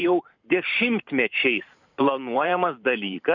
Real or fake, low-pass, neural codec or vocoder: fake; 7.2 kHz; vocoder, 44.1 kHz, 128 mel bands every 512 samples, BigVGAN v2